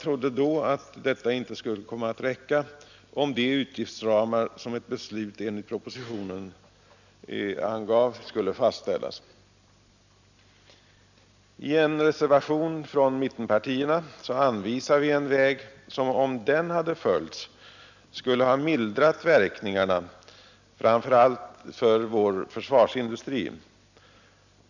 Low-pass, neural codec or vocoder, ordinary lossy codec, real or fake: 7.2 kHz; none; none; real